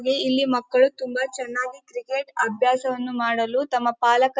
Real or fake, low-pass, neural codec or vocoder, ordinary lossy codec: real; none; none; none